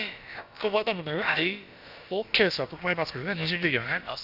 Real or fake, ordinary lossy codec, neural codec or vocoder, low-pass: fake; none; codec, 16 kHz, about 1 kbps, DyCAST, with the encoder's durations; 5.4 kHz